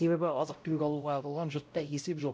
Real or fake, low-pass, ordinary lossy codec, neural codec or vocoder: fake; none; none; codec, 16 kHz, 0.5 kbps, X-Codec, WavLM features, trained on Multilingual LibriSpeech